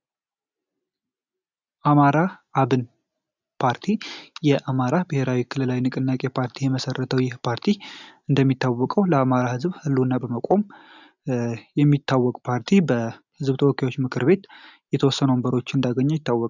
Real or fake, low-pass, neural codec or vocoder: real; 7.2 kHz; none